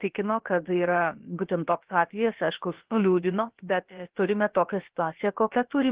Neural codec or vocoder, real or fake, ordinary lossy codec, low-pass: codec, 16 kHz, about 1 kbps, DyCAST, with the encoder's durations; fake; Opus, 16 kbps; 3.6 kHz